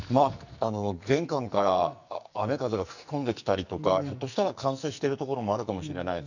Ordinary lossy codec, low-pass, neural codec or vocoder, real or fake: none; 7.2 kHz; codec, 44.1 kHz, 2.6 kbps, SNAC; fake